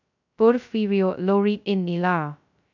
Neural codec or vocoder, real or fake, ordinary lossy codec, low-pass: codec, 16 kHz, 0.2 kbps, FocalCodec; fake; none; 7.2 kHz